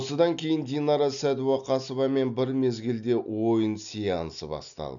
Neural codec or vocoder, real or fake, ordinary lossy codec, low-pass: none; real; none; 7.2 kHz